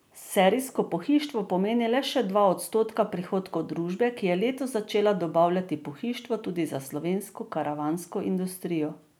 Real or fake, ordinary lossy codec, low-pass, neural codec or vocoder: real; none; none; none